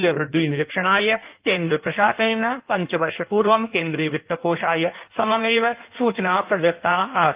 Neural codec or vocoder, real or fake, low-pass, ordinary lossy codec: codec, 16 kHz in and 24 kHz out, 1.1 kbps, FireRedTTS-2 codec; fake; 3.6 kHz; Opus, 32 kbps